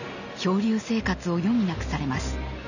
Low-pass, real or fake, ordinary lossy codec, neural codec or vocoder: 7.2 kHz; real; none; none